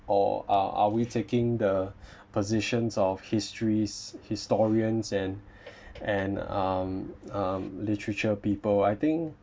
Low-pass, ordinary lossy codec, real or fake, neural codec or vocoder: none; none; real; none